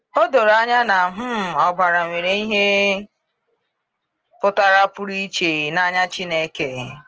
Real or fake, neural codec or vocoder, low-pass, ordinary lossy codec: real; none; 7.2 kHz; Opus, 16 kbps